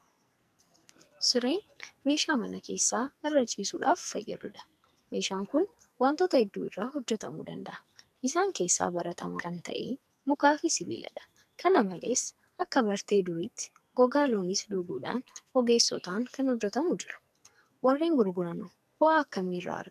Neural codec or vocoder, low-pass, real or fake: codec, 44.1 kHz, 2.6 kbps, SNAC; 14.4 kHz; fake